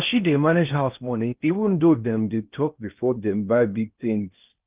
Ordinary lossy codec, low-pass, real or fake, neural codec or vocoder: Opus, 24 kbps; 3.6 kHz; fake; codec, 16 kHz in and 24 kHz out, 0.6 kbps, FocalCodec, streaming, 4096 codes